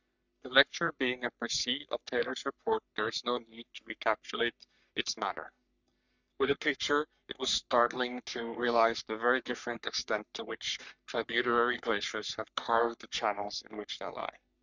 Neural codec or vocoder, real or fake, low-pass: codec, 44.1 kHz, 3.4 kbps, Pupu-Codec; fake; 7.2 kHz